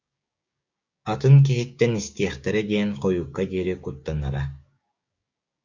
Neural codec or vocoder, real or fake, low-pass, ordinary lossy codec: codec, 16 kHz, 6 kbps, DAC; fake; 7.2 kHz; Opus, 64 kbps